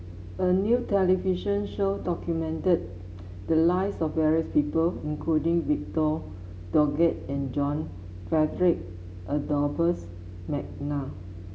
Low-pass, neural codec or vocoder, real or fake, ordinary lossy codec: none; none; real; none